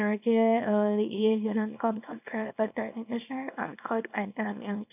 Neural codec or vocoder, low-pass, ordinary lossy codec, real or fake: codec, 24 kHz, 0.9 kbps, WavTokenizer, small release; 3.6 kHz; none; fake